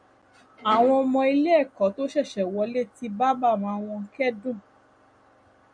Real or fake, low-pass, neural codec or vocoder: real; 9.9 kHz; none